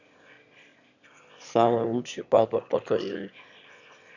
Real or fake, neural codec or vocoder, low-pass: fake; autoencoder, 22.05 kHz, a latent of 192 numbers a frame, VITS, trained on one speaker; 7.2 kHz